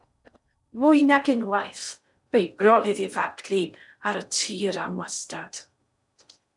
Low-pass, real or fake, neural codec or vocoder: 10.8 kHz; fake; codec, 16 kHz in and 24 kHz out, 0.6 kbps, FocalCodec, streaming, 4096 codes